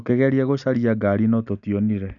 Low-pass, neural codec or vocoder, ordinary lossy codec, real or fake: 7.2 kHz; none; none; real